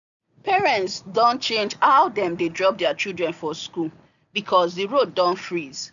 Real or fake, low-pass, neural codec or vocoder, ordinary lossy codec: real; 7.2 kHz; none; none